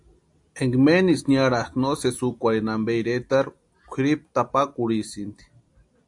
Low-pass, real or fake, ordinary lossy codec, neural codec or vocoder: 10.8 kHz; real; AAC, 64 kbps; none